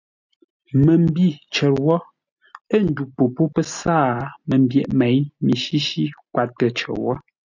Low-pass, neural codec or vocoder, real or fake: 7.2 kHz; none; real